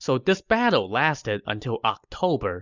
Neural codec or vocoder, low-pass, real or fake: none; 7.2 kHz; real